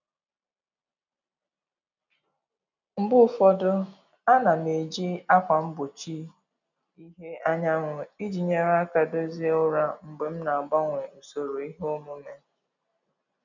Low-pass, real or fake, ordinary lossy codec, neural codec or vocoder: 7.2 kHz; real; none; none